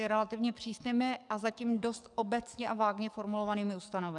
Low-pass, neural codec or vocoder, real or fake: 10.8 kHz; codec, 44.1 kHz, 7.8 kbps, DAC; fake